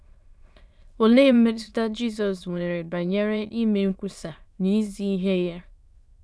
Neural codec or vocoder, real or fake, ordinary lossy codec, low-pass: autoencoder, 22.05 kHz, a latent of 192 numbers a frame, VITS, trained on many speakers; fake; none; none